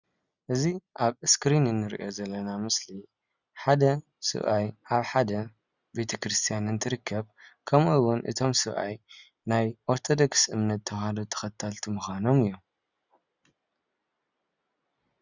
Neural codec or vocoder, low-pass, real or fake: none; 7.2 kHz; real